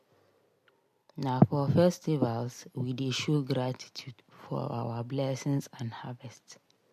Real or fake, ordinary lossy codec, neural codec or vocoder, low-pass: real; MP3, 64 kbps; none; 14.4 kHz